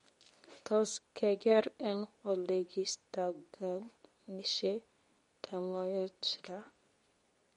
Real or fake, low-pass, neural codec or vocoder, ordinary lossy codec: fake; 10.8 kHz; codec, 24 kHz, 0.9 kbps, WavTokenizer, medium speech release version 2; MP3, 48 kbps